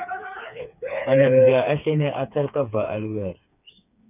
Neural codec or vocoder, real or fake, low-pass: codec, 16 kHz, 4 kbps, FreqCodec, smaller model; fake; 3.6 kHz